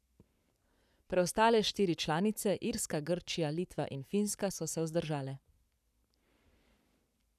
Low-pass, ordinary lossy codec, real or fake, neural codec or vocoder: 14.4 kHz; none; fake; codec, 44.1 kHz, 7.8 kbps, Pupu-Codec